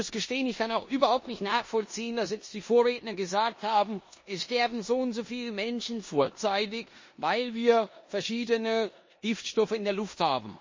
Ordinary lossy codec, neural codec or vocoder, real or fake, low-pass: MP3, 32 kbps; codec, 16 kHz in and 24 kHz out, 0.9 kbps, LongCat-Audio-Codec, four codebook decoder; fake; 7.2 kHz